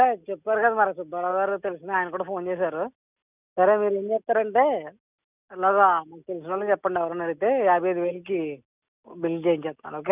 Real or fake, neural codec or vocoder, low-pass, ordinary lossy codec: real; none; 3.6 kHz; none